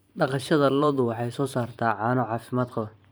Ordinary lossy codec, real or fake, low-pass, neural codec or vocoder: none; fake; none; vocoder, 44.1 kHz, 128 mel bands every 512 samples, BigVGAN v2